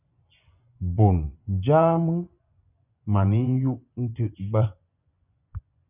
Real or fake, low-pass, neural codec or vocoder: fake; 3.6 kHz; vocoder, 24 kHz, 100 mel bands, Vocos